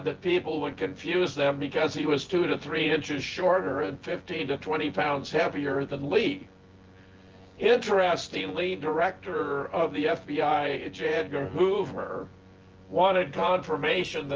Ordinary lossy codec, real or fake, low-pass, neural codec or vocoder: Opus, 16 kbps; fake; 7.2 kHz; vocoder, 24 kHz, 100 mel bands, Vocos